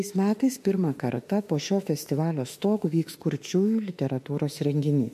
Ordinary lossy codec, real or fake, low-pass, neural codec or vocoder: MP3, 64 kbps; fake; 14.4 kHz; autoencoder, 48 kHz, 32 numbers a frame, DAC-VAE, trained on Japanese speech